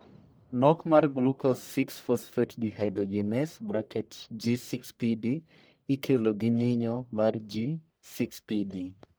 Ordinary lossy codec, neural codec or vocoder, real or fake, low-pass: none; codec, 44.1 kHz, 1.7 kbps, Pupu-Codec; fake; none